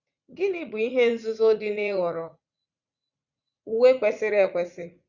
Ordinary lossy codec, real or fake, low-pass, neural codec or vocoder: Opus, 64 kbps; fake; 7.2 kHz; vocoder, 44.1 kHz, 80 mel bands, Vocos